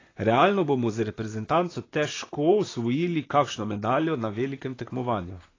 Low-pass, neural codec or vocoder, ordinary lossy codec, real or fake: 7.2 kHz; vocoder, 44.1 kHz, 128 mel bands, Pupu-Vocoder; AAC, 32 kbps; fake